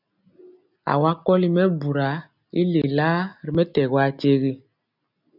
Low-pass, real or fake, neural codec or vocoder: 5.4 kHz; real; none